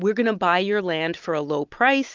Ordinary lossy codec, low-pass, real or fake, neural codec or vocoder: Opus, 24 kbps; 7.2 kHz; real; none